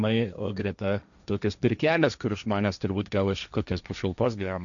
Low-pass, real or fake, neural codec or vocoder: 7.2 kHz; fake; codec, 16 kHz, 1.1 kbps, Voila-Tokenizer